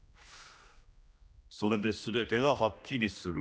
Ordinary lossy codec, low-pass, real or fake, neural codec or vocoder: none; none; fake; codec, 16 kHz, 1 kbps, X-Codec, HuBERT features, trained on general audio